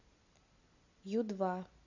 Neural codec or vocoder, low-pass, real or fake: none; 7.2 kHz; real